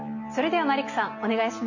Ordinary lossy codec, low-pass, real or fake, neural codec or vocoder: none; 7.2 kHz; real; none